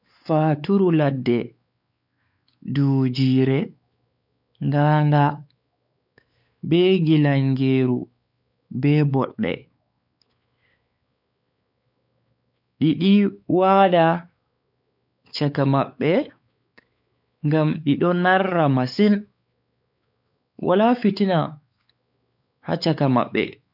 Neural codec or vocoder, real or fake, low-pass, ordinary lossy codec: codec, 16 kHz, 4 kbps, X-Codec, WavLM features, trained on Multilingual LibriSpeech; fake; 5.4 kHz; none